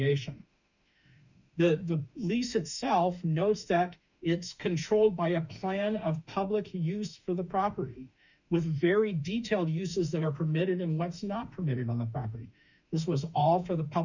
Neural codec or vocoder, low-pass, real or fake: autoencoder, 48 kHz, 32 numbers a frame, DAC-VAE, trained on Japanese speech; 7.2 kHz; fake